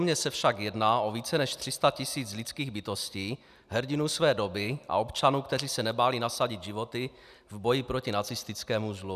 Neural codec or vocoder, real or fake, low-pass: none; real; 14.4 kHz